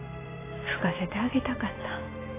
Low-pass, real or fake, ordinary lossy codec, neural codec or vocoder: 3.6 kHz; real; AAC, 16 kbps; none